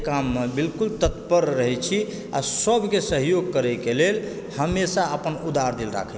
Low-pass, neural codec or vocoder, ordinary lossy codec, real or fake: none; none; none; real